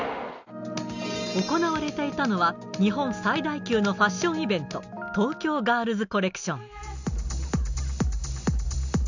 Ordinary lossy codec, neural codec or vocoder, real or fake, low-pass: none; none; real; 7.2 kHz